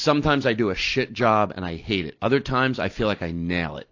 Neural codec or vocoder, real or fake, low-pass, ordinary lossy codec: none; real; 7.2 kHz; AAC, 48 kbps